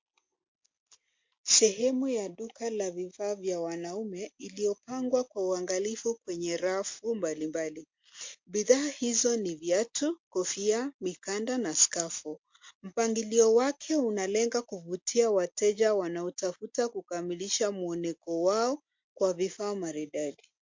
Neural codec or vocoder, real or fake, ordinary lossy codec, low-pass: none; real; MP3, 48 kbps; 7.2 kHz